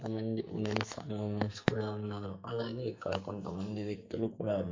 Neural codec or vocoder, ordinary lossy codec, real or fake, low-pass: codec, 44.1 kHz, 2.6 kbps, SNAC; MP3, 48 kbps; fake; 7.2 kHz